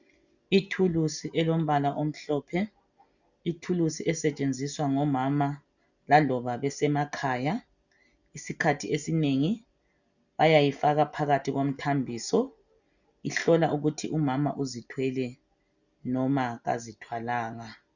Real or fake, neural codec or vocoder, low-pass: real; none; 7.2 kHz